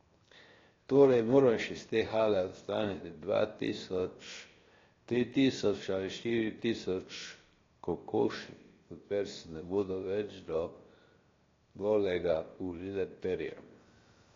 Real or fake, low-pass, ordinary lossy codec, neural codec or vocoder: fake; 7.2 kHz; AAC, 32 kbps; codec, 16 kHz, 0.7 kbps, FocalCodec